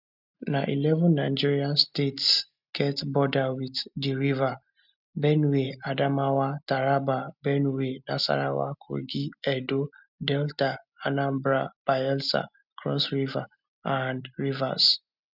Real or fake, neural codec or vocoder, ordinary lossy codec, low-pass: real; none; none; 5.4 kHz